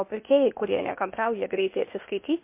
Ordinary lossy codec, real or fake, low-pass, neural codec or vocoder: MP3, 24 kbps; fake; 3.6 kHz; codec, 16 kHz, 0.8 kbps, ZipCodec